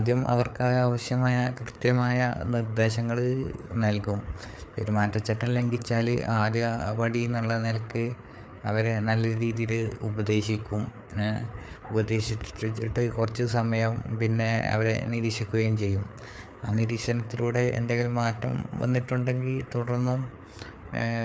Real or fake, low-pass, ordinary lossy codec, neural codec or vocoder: fake; none; none; codec, 16 kHz, 4 kbps, FreqCodec, larger model